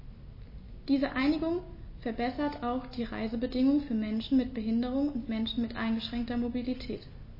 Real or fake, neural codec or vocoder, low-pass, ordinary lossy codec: real; none; 5.4 kHz; MP3, 24 kbps